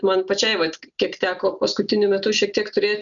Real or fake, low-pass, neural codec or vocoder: real; 7.2 kHz; none